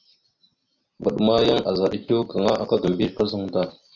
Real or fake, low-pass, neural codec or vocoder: real; 7.2 kHz; none